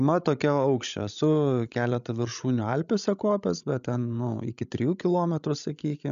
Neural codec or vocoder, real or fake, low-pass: codec, 16 kHz, 16 kbps, FreqCodec, larger model; fake; 7.2 kHz